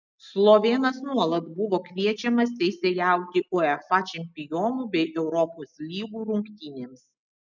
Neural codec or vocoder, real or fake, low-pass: none; real; 7.2 kHz